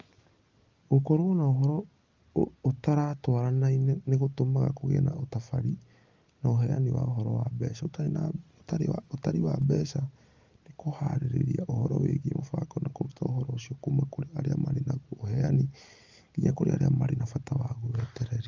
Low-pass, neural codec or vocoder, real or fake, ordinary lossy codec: 7.2 kHz; none; real; Opus, 32 kbps